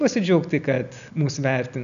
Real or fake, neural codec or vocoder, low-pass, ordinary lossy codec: real; none; 7.2 kHz; MP3, 96 kbps